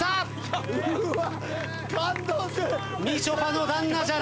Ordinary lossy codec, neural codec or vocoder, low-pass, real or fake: none; none; none; real